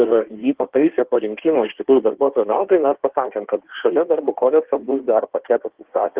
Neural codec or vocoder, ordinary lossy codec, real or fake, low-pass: codec, 16 kHz in and 24 kHz out, 1.1 kbps, FireRedTTS-2 codec; Opus, 16 kbps; fake; 3.6 kHz